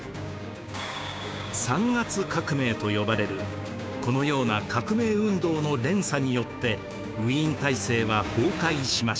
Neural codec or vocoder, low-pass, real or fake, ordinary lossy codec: codec, 16 kHz, 6 kbps, DAC; none; fake; none